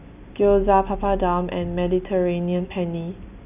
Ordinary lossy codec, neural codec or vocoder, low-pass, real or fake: none; none; 3.6 kHz; real